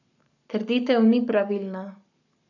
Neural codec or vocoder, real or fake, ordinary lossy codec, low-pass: codec, 44.1 kHz, 7.8 kbps, Pupu-Codec; fake; none; 7.2 kHz